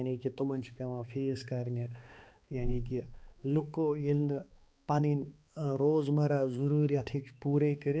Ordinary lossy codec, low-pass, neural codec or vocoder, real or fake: none; none; codec, 16 kHz, 2 kbps, X-Codec, HuBERT features, trained on balanced general audio; fake